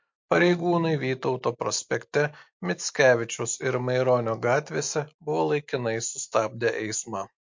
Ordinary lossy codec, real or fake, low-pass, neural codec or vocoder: MP3, 48 kbps; real; 7.2 kHz; none